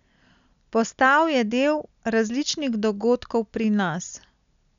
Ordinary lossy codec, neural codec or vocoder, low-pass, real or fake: none; none; 7.2 kHz; real